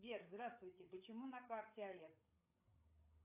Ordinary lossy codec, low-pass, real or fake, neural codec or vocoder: AAC, 32 kbps; 3.6 kHz; fake; codec, 16 kHz, 8 kbps, FreqCodec, larger model